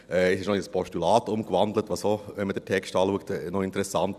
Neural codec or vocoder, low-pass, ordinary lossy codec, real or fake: none; 14.4 kHz; none; real